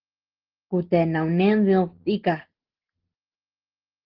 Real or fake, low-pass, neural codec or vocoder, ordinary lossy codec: real; 5.4 kHz; none; Opus, 16 kbps